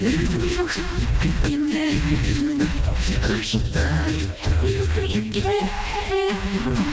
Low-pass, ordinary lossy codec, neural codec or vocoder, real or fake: none; none; codec, 16 kHz, 1 kbps, FreqCodec, smaller model; fake